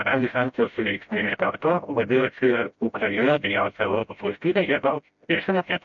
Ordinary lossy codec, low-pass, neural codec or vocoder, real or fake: MP3, 48 kbps; 7.2 kHz; codec, 16 kHz, 0.5 kbps, FreqCodec, smaller model; fake